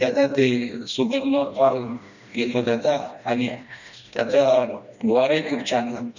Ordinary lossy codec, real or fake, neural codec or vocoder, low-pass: none; fake; codec, 16 kHz, 1 kbps, FreqCodec, smaller model; 7.2 kHz